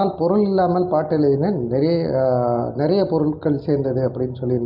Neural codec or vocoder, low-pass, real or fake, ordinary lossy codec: none; 5.4 kHz; real; Opus, 24 kbps